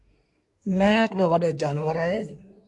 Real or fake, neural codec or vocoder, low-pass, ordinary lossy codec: fake; codec, 24 kHz, 1 kbps, SNAC; 10.8 kHz; AAC, 64 kbps